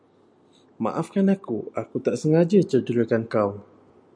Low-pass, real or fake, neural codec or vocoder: 9.9 kHz; real; none